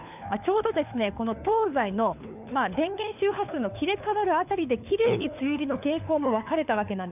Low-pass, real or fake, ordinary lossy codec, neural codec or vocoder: 3.6 kHz; fake; none; codec, 16 kHz, 4 kbps, FunCodec, trained on LibriTTS, 50 frames a second